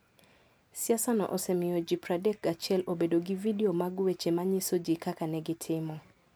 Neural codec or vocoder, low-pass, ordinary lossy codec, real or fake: none; none; none; real